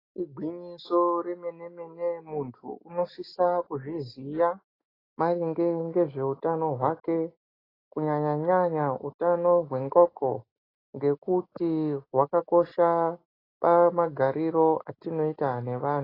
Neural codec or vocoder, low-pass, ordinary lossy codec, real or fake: none; 5.4 kHz; AAC, 24 kbps; real